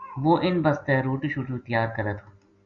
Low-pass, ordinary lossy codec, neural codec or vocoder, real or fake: 7.2 kHz; Opus, 64 kbps; none; real